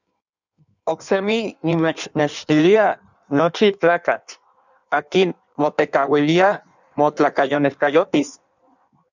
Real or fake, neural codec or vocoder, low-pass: fake; codec, 16 kHz in and 24 kHz out, 1.1 kbps, FireRedTTS-2 codec; 7.2 kHz